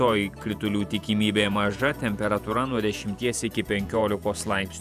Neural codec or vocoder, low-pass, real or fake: vocoder, 48 kHz, 128 mel bands, Vocos; 14.4 kHz; fake